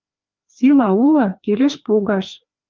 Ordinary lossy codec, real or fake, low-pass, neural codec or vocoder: Opus, 32 kbps; fake; 7.2 kHz; codec, 16 kHz, 2 kbps, FreqCodec, larger model